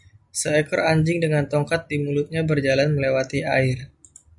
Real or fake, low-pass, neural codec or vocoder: real; 10.8 kHz; none